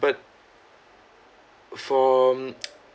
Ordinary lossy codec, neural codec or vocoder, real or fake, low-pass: none; none; real; none